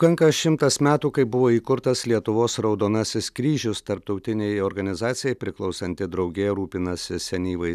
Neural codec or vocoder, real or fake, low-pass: none; real; 14.4 kHz